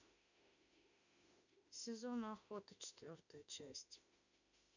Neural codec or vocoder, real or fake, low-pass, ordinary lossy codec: autoencoder, 48 kHz, 32 numbers a frame, DAC-VAE, trained on Japanese speech; fake; 7.2 kHz; none